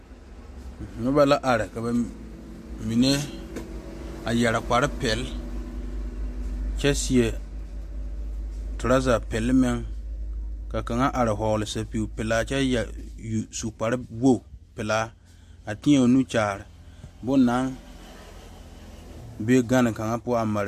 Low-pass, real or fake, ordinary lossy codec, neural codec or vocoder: 14.4 kHz; real; MP3, 64 kbps; none